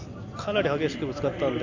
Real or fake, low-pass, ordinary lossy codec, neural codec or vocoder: real; 7.2 kHz; AAC, 48 kbps; none